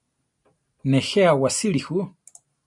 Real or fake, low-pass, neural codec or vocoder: real; 10.8 kHz; none